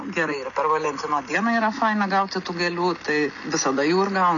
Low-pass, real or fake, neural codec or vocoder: 7.2 kHz; real; none